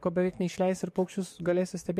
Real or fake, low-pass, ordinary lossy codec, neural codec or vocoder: fake; 14.4 kHz; MP3, 64 kbps; codec, 44.1 kHz, 7.8 kbps, DAC